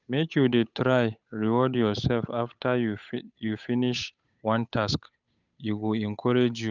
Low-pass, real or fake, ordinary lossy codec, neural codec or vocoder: 7.2 kHz; fake; none; codec, 16 kHz, 8 kbps, FunCodec, trained on Chinese and English, 25 frames a second